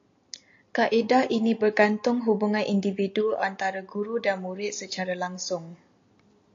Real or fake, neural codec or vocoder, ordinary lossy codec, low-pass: real; none; AAC, 48 kbps; 7.2 kHz